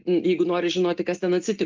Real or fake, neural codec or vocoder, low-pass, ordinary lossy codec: real; none; 7.2 kHz; Opus, 32 kbps